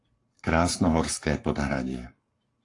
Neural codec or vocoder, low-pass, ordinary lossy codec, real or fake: codec, 44.1 kHz, 7.8 kbps, Pupu-Codec; 10.8 kHz; AAC, 48 kbps; fake